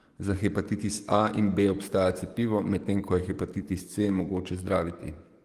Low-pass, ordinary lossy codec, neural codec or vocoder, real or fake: 14.4 kHz; Opus, 24 kbps; codec, 44.1 kHz, 7.8 kbps, DAC; fake